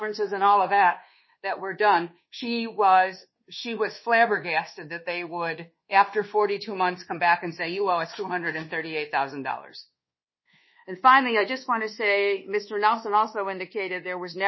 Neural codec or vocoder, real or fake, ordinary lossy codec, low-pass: codec, 24 kHz, 1.2 kbps, DualCodec; fake; MP3, 24 kbps; 7.2 kHz